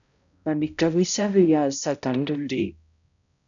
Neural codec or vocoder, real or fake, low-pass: codec, 16 kHz, 0.5 kbps, X-Codec, HuBERT features, trained on balanced general audio; fake; 7.2 kHz